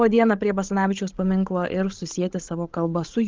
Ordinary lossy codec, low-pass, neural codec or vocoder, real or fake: Opus, 16 kbps; 7.2 kHz; codec, 16 kHz, 16 kbps, FreqCodec, larger model; fake